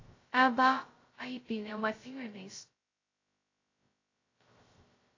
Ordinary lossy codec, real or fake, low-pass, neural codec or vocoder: AAC, 32 kbps; fake; 7.2 kHz; codec, 16 kHz, 0.2 kbps, FocalCodec